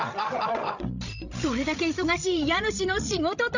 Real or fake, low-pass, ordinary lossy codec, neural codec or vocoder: fake; 7.2 kHz; none; codec, 16 kHz, 16 kbps, FreqCodec, larger model